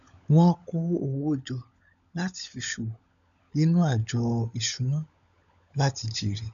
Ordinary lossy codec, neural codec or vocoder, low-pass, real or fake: none; codec, 16 kHz, 16 kbps, FunCodec, trained on LibriTTS, 50 frames a second; 7.2 kHz; fake